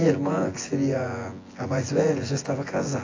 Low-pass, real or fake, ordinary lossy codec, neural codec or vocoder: 7.2 kHz; fake; AAC, 32 kbps; vocoder, 24 kHz, 100 mel bands, Vocos